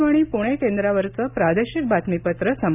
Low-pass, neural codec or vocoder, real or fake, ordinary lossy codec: 3.6 kHz; none; real; none